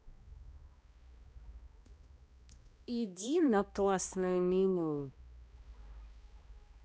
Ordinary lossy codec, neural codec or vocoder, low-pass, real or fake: none; codec, 16 kHz, 1 kbps, X-Codec, HuBERT features, trained on balanced general audio; none; fake